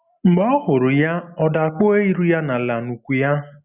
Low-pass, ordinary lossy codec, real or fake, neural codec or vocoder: 3.6 kHz; none; real; none